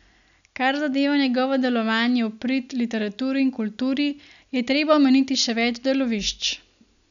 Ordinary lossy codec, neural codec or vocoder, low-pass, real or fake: none; none; 7.2 kHz; real